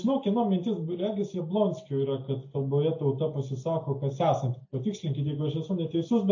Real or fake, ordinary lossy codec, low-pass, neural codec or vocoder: real; AAC, 48 kbps; 7.2 kHz; none